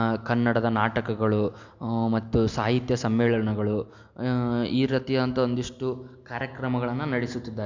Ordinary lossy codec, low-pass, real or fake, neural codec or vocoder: MP3, 64 kbps; 7.2 kHz; real; none